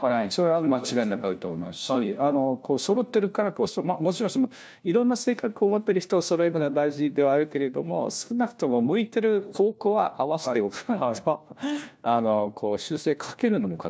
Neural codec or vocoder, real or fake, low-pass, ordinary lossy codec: codec, 16 kHz, 1 kbps, FunCodec, trained on LibriTTS, 50 frames a second; fake; none; none